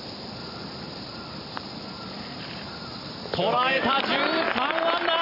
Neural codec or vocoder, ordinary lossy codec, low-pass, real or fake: vocoder, 44.1 kHz, 128 mel bands every 512 samples, BigVGAN v2; none; 5.4 kHz; fake